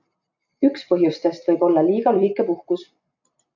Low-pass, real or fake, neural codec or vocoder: 7.2 kHz; real; none